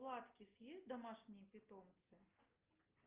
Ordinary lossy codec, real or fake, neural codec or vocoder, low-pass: Opus, 32 kbps; real; none; 3.6 kHz